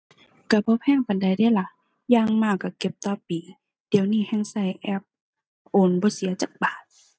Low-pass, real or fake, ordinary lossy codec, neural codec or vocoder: none; real; none; none